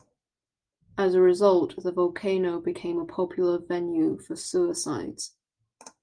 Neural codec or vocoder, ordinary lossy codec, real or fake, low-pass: none; Opus, 24 kbps; real; 9.9 kHz